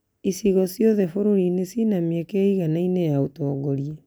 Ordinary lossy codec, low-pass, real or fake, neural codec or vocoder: none; none; real; none